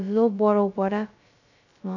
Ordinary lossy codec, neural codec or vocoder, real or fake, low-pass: none; codec, 16 kHz, 0.2 kbps, FocalCodec; fake; 7.2 kHz